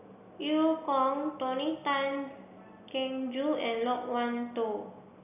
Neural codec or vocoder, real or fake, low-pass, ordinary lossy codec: none; real; 3.6 kHz; none